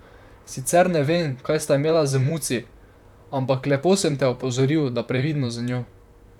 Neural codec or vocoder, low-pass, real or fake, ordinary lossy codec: vocoder, 44.1 kHz, 128 mel bands, Pupu-Vocoder; 19.8 kHz; fake; none